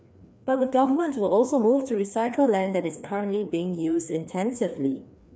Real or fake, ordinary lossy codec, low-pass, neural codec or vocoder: fake; none; none; codec, 16 kHz, 2 kbps, FreqCodec, larger model